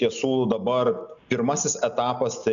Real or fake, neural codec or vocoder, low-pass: real; none; 7.2 kHz